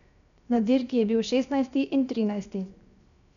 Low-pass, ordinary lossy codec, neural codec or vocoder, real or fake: 7.2 kHz; none; codec, 16 kHz, 0.7 kbps, FocalCodec; fake